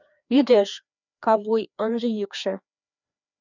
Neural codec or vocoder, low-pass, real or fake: codec, 16 kHz, 2 kbps, FreqCodec, larger model; 7.2 kHz; fake